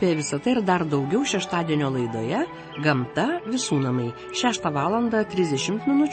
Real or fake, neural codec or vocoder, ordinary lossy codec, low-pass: real; none; MP3, 32 kbps; 9.9 kHz